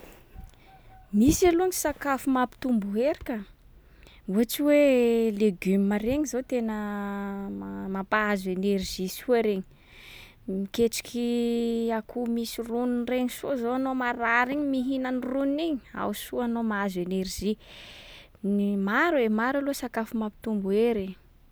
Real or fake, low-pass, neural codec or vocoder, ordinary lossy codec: real; none; none; none